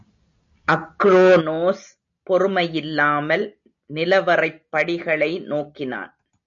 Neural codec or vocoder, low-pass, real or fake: none; 7.2 kHz; real